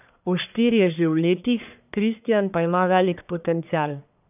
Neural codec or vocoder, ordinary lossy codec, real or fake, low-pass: codec, 44.1 kHz, 1.7 kbps, Pupu-Codec; none; fake; 3.6 kHz